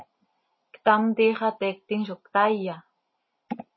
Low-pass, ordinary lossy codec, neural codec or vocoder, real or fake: 7.2 kHz; MP3, 24 kbps; none; real